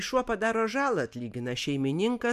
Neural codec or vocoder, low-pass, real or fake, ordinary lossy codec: none; 14.4 kHz; real; MP3, 96 kbps